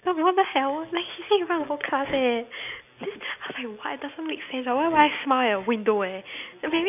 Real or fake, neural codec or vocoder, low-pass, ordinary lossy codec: real; none; 3.6 kHz; none